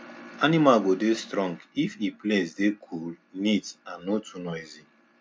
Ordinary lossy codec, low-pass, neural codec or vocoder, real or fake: none; none; none; real